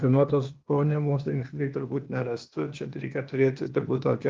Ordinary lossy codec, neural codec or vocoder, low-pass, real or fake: Opus, 32 kbps; codec, 16 kHz, 0.8 kbps, ZipCodec; 7.2 kHz; fake